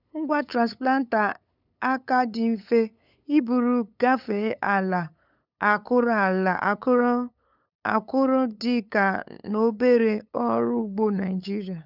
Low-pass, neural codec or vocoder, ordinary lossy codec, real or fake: 5.4 kHz; codec, 16 kHz, 8 kbps, FunCodec, trained on LibriTTS, 25 frames a second; none; fake